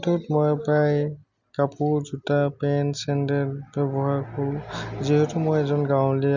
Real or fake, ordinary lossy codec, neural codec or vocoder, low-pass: real; none; none; 7.2 kHz